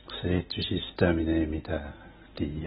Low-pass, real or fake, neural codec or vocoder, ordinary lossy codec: 7.2 kHz; real; none; AAC, 16 kbps